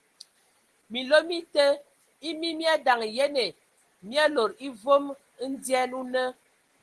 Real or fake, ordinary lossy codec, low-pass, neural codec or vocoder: real; Opus, 16 kbps; 10.8 kHz; none